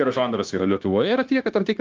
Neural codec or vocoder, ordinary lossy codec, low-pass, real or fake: codec, 16 kHz, 0.9 kbps, LongCat-Audio-Codec; Opus, 32 kbps; 7.2 kHz; fake